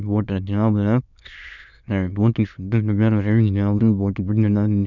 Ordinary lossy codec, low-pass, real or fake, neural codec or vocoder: none; 7.2 kHz; fake; autoencoder, 22.05 kHz, a latent of 192 numbers a frame, VITS, trained on many speakers